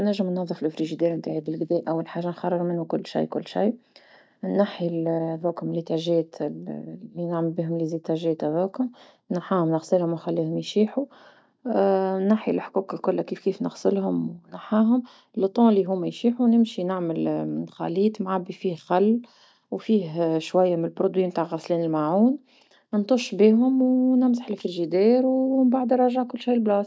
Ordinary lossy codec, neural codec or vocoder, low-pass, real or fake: none; none; none; real